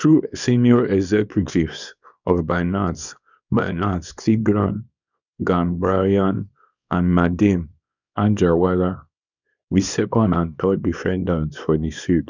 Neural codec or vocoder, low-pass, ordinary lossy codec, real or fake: codec, 24 kHz, 0.9 kbps, WavTokenizer, small release; 7.2 kHz; none; fake